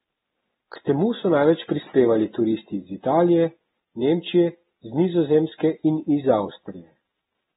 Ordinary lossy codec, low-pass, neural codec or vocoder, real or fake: AAC, 16 kbps; 19.8 kHz; none; real